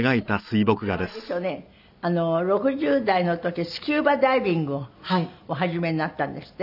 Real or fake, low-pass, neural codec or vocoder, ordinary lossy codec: real; 5.4 kHz; none; none